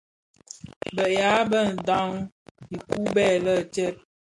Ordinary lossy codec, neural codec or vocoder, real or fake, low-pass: MP3, 64 kbps; none; real; 10.8 kHz